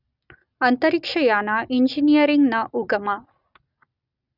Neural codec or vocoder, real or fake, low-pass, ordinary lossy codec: none; real; 5.4 kHz; none